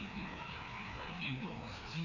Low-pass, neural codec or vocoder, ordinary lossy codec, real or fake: 7.2 kHz; codec, 16 kHz, 2 kbps, FreqCodec, larger model; none; fake